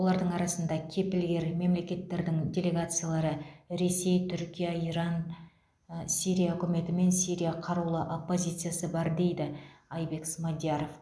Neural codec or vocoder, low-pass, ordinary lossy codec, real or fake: none; none; none; real